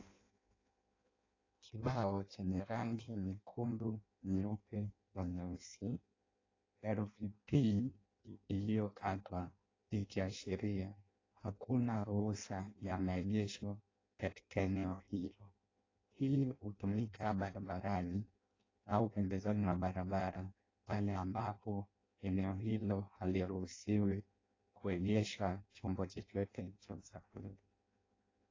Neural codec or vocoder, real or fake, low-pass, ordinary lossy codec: codec, 16 kHz in and 24 kHz out, 0.6 kbps, FireRedTTS-2 codec; fake; 7.2 kHz; AAC, 32 kbps